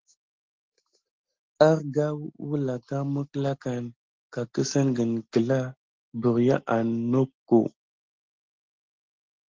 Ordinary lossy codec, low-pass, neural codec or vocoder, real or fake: Opus, 16 kbps; 7.2 kHz; none; real